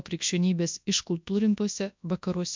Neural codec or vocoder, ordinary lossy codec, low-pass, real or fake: codec, 24 kHz, 0.9 kbps, WavTokenizer, large speech release; MP3, 64 kbps; 7.2 kHz; fake